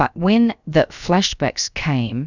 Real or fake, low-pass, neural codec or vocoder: fake; 7.2 kHz; codec, 16 kHz, about 1 kbps, DyCAST, with the encoder's durations